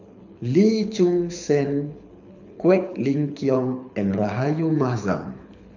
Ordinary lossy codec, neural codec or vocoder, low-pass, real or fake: none; codec, 24 kHz, 6 kbps, HILCodec; 7.2 kHz; fake